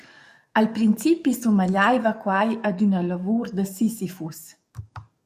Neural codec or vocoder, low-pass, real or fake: codec, 44.1 kHz, 7.8 kbps, DAC; 14.4 kHz; fake